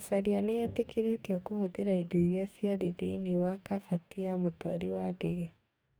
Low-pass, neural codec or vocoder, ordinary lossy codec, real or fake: none; codec, 44.1 kHz, 2.6 kbps, DAC; none; fake